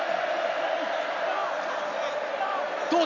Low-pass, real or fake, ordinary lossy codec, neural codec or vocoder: 7.2 kHz; real; none; none